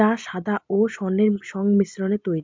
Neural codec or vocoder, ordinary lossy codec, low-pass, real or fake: none; MP3, 64 kbps; 7.2 kHz; real